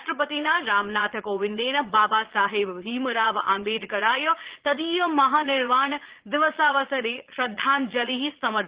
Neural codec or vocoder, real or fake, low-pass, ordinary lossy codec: vocoder, 22.05 kHz, 80 mel bands, Vocos; fake; 3.6 kHz; Opus, 16 kbps